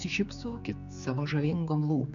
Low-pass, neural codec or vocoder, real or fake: 7.2 kHz; codec, 16 kHz, 4 kbps, X-Codec, HuBERT features, trained on general audio; fake